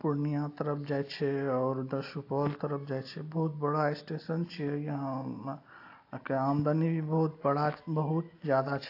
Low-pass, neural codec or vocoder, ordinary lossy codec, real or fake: 5.4 kHz; none; AAC, 32 kbps; real